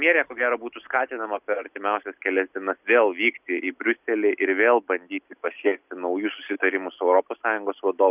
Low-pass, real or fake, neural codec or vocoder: 3.6 kHz; real; none